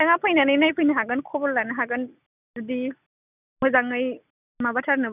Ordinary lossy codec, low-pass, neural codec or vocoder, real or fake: none; 3.6 kHz; none; real